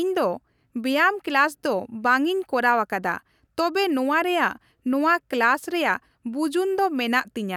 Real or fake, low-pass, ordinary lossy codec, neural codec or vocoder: real; 19.8 kHz; none; none